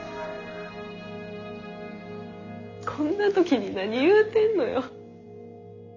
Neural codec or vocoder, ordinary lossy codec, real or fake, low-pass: none; none; real; 7.2 kHz